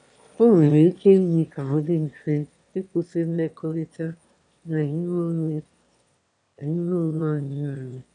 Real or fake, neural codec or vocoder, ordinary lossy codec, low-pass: fake; autoencoder, 22.05 kHz, a latent of 192 numbers a frame, VITS, trained on one speaker; none; 9.9 kHz